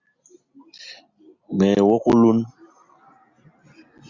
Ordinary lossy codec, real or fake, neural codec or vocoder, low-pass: Opus, 64 kbps; real; none; 7.2 kHz